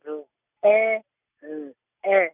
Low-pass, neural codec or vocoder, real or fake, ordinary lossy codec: 3.6 kHz; none; real; none